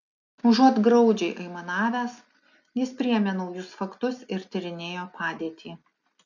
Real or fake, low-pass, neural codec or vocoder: real; 7.2 kHz; none